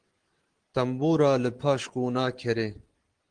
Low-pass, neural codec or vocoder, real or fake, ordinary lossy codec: 9.9 kHz; none; real; Opus, 16 kbps